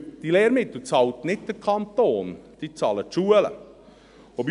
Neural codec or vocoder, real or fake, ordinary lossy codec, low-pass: none; real; none; 10.8 kHz